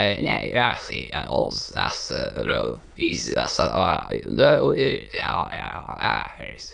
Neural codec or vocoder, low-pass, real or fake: autoencoder, 22.05 kHz, a latent of 192 numbers a frame, VITS, trained on many speakers; 9.9 kHz; fake